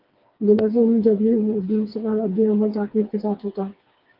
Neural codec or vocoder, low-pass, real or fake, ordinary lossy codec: codec, 16 kHz, 4 kbps, FreqCodec, smaller model; 5.4 kHz; fake; Opus, 32 kbps